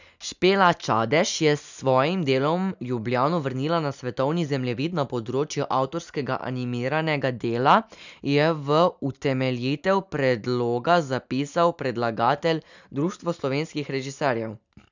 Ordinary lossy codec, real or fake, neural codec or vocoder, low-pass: none; real; none; 7.2 kHz